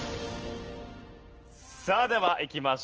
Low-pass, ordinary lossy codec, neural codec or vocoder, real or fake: 7.2 kHz; Opus, 16 kbps; none; real